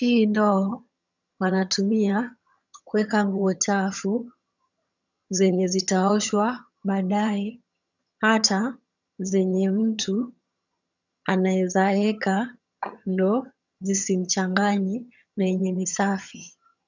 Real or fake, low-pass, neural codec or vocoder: fake; 7.2 kHz; vocoder, 22.05 kHz, 80 mel bands, HiFi-GAN